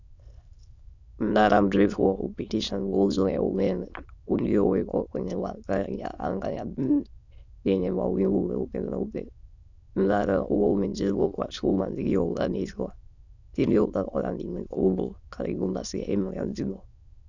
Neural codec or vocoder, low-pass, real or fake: autoencoder, 22.05 kHz, a latent of 192 numbers a frame, VITS, trained on many speakers; 7.2 kHz; fake